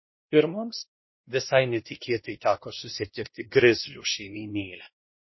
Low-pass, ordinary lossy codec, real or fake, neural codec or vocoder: 7.2 kHz; MP3, 24 kbps; fake; codec, 16 kHz, 1 kbps, X-Codec, WavLM features, trained on Multilingual LibriSpeech